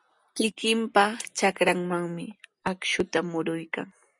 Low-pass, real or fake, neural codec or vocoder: 10.8 kHz; real; none